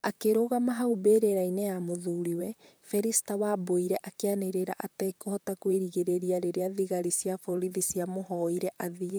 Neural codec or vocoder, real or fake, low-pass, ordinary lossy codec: vocoder, 44.1 kHz, 128 mel bands, Pupu-Vocoder; fake; none; none